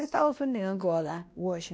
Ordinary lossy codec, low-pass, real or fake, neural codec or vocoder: none; none; fake; codec, 16 kHz, 1 kbps, X-Codec, WavLM features, trained on Multilingual LibriSpeech